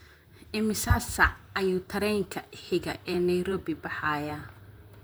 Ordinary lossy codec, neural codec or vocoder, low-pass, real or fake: none; vocoder, 44.1 kHz, 128 mel bands, Pupu-Vocoder; none; fake